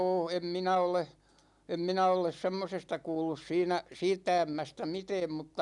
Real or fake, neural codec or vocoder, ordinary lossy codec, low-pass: real; none; none; 10.8 kHz